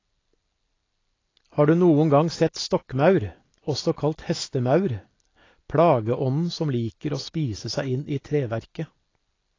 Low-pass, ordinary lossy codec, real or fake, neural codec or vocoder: 7.2 kHz; AAC, 32 kbps; real; none